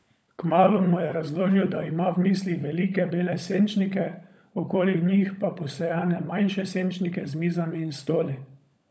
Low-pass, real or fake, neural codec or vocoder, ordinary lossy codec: none; fake; codec, 16 kHz, 16 kbps, FunCodec, trained on LibriTTS, 50 frames a second; none